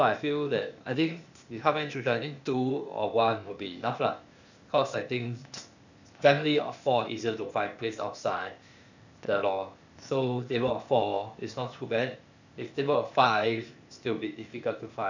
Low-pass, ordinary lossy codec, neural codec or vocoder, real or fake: 7.2 kHz; none; codec, 16 kHz, 0.8 kbps, ZipCodec; fake